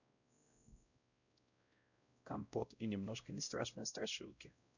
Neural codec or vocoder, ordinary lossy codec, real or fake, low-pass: codec, 16 kHz, 0.5 kbps, X-Codec, WavLM features, trained on Multilingual LibriSpeech; none; fake; 7.2 kHz